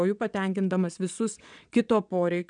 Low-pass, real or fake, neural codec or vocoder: 9.9 kHz; fake; vocoder, 22.05 kHz, 80 mel bands, WaveNeXt